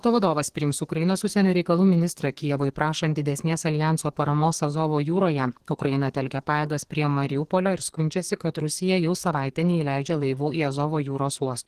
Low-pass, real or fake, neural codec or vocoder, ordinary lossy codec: 14.4 kHz; fake; codec, 44.1 kHz, 2.6 kbps, SNAC; Opus, 16 kbps